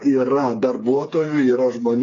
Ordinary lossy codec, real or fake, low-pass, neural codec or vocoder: AAC, 32 kbps; fake; 7.2 kHz; codec, 16 kHz, 4 kbps, FreqCodec, smaller model